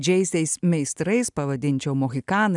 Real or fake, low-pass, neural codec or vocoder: real; 10.8 kHz; none